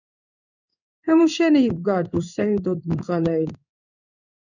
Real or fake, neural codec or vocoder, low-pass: fake; codec, 16 kHz in and 24 kHz out, 1 kbps, XY-Tokenizer; 7.2 kHz